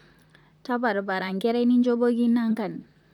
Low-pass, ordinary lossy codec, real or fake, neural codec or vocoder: 19.8 kHz; none; fake; vocoder, 44.1 kHz, 128 mel bands, Pupu-Vocoder